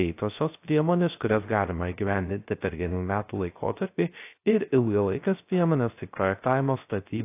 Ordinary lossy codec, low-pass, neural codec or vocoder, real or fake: AAC, 24 kbps; 3.6 kHz; codec, 16 kHz, 0.3 kbps, FocalCodec; fake